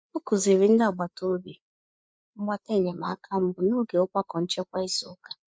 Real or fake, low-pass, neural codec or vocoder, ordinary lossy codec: fake; none; codec, 16 kHz, 4 kbps, FreqCodec, larger model; none